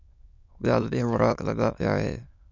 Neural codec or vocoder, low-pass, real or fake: autoencoder, 22.05 kHz, a latent of 192 numbers a frame, VITS, trained on many speakers; 7.2 kHz; fake